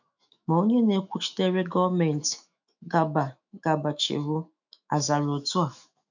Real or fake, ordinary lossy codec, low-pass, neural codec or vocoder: fake; none; 7.2 kHz; autoencoder, 48 kHz, 128 numbers a frame, DAC-VAE, trained on Japanese speech